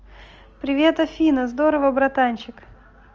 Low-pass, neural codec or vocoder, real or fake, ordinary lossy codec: 7.2 kHz; none; real; Opus, 24 kbps